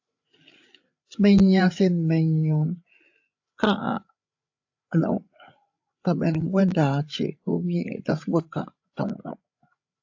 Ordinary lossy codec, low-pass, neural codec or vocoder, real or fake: AAC, 48 kbps; 7.2 kHz; codec, 16 kHz, 8 kbps, FreqCodec, larger model; fake